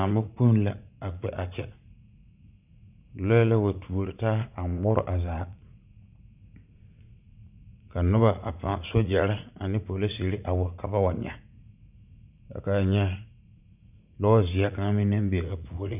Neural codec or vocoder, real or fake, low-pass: vocoder, 44.1 kHz, 80 mel bands, Vocos; fake; 3.6 kHz